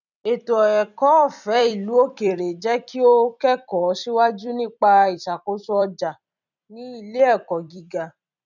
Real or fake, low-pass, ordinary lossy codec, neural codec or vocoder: fake; 7.2 kHz; none; vocoder, 44.1 kHz, 128 mel bands every 256 samples, BigVGAN v2